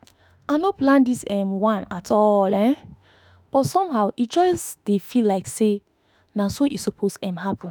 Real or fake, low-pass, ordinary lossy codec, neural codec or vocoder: fake; none; none; autoencoder, 48 kHz, 32 numbers a frame, DAC-VAE, trained on Japanese speech